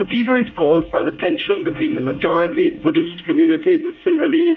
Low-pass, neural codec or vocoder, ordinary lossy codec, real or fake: 7.2 kHz; codec, 24 kHz, 1 kbps, SNAC; MP3, 64 kbps; fake